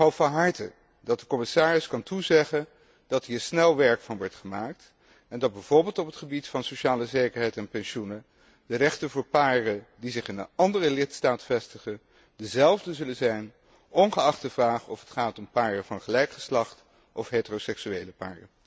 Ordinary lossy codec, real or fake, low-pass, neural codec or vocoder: none; real; none; none